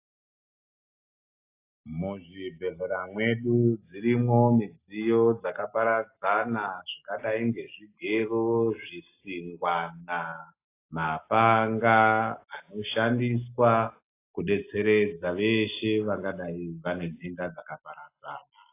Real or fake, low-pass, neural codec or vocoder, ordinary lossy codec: real; 3.6 kHz; none; AAC, 24 kbps